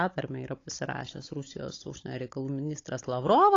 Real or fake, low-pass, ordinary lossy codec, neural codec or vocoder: fake; 7.2 kHz; AAC, 32 kbps; codec, 16 kHz, 16 kbps, FunCodec, trained on Chinese and English, 50 frames a second